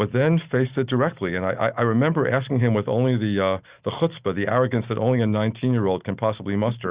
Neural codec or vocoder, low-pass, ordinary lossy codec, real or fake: none; 3.6 kHz; Opus, 64 kbps; real